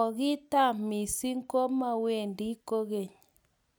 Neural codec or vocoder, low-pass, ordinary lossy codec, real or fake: none; none; none; real